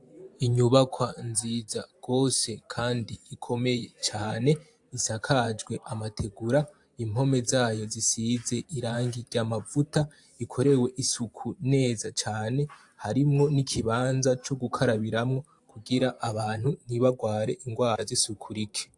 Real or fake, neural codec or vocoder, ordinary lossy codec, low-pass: fake; vocoder, 44.1 kHz, 128 mel bands every 512 samples, BigVGAN v2; AAC, 64 kbps; 10.8 kHz